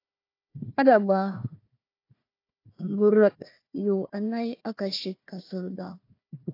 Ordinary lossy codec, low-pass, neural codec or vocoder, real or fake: AAC, 32 kbps; 5.4 kHz; codec, 16 kHz, 1 kbps, FunCodec, trained on Chinese and English, 50 frames a second; fake